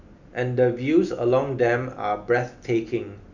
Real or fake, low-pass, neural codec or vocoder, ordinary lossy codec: real; 7.2 kHz; none; none